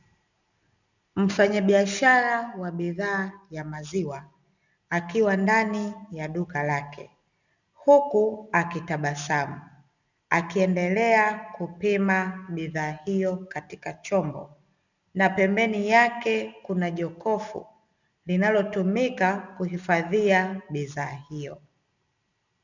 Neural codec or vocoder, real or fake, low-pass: none; real; 7.2 kHz